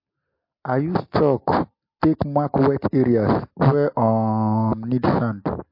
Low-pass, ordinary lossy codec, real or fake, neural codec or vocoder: 5.4 kHz; MP3, 32 kbps; real; none